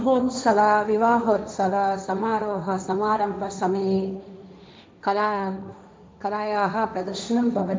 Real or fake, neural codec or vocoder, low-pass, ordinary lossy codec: fake; codec, 16 kHz, 1.1 kbps, Voila-Tokenizer; none; none